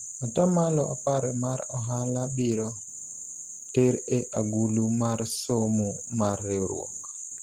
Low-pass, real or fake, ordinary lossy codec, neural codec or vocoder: 19.8 kHz; real; Opus, 16 kbps; none